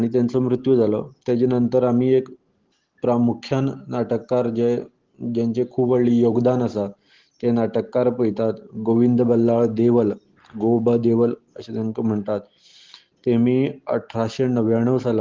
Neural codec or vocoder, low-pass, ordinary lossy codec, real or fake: none; 7.2 kHz; Opus, 16 kbps; real